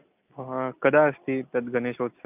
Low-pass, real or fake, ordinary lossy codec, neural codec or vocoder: 3.6 kHz; real; none; none